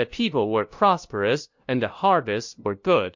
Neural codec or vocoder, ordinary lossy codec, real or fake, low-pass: codec, 16 kHz, 0.5 kbps, FunCodec, trained on LibriTTS, 25 frames a second; MP3, 48 kbps; fake; 7.2 kHz